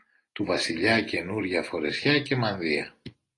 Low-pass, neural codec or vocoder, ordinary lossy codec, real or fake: 10.8 kHz; none; AAC, 32 kbps; real